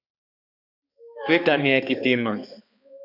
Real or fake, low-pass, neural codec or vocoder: fake; 5.4 kHz; codec, 16 kHz, 2 kbps, X-Codec, HuBERT features, trained on balanced general audio